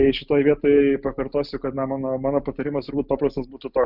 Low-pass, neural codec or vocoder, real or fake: 5.4 kHz; none; real